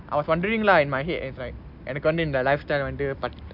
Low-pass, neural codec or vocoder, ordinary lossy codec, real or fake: 5.4 kHz; none; none; real